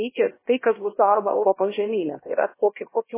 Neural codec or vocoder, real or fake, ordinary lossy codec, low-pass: codec, 16 kHz, 1 kbps, X-Codec, HuBERT features, trained on LibriSpeech; fake; MP3, 16 kbps; 3.6 kHz